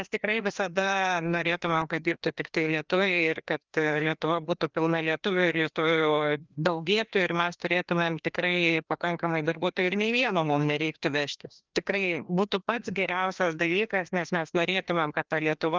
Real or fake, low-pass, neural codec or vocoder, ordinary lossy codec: fake; 7.2 kHz; codec, 16 kHz, 1 kbps, FreqCodec, larger model; Opus, 24 kbps